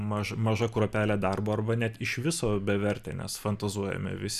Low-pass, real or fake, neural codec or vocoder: 14.4 kHz; real; none